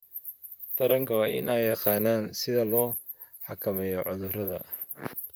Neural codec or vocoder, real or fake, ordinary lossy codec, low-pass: vocoder, 44.1 kHz, 128 mel bands, Pupu-Vocoder; fake; none; none